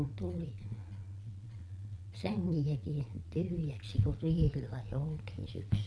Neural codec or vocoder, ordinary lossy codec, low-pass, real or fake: vocoder, 22.05 kHz, 80 mel bands, Vocos; none; none; fake